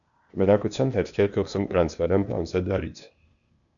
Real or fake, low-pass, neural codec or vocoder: fake; 7.2 kHz; codec, 16 kHz, 0.8 kbps, ZipCodec